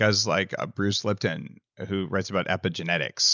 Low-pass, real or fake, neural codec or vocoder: 7.2 kHz; real; none